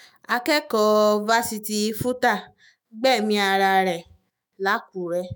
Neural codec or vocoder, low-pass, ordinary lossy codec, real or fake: autoencoder, 48 kHz, 128 numbers a frame, DAC-VAE, trained on Japanese speech; none; none; fake